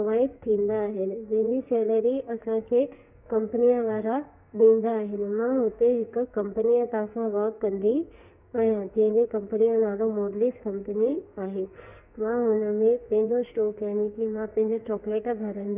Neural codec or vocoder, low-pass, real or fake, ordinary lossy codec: codec, 44.1 kHz, 2.6 kbps, SNAC; 3.6 kHz; fake; none